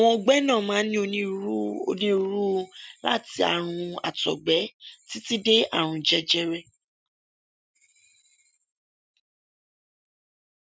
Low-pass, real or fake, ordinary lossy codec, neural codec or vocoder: none; real; none; none